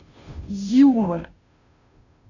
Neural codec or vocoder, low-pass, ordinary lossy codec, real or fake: codec, 16 kHz, 0.5 kbps, FunCodec, trained on Chinese and English, 25 frames a second; 7.2 kHz; none; fake